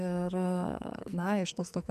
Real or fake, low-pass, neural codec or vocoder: fake; 14.4 kHz; codec, 44.1 kHz, 2.6 kbps, SNAC